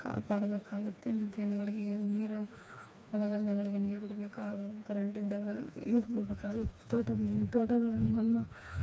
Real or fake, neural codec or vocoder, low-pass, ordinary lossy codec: fake; codec, 16 kHz, 2 kbps, FreqCodec, smaller model; none; none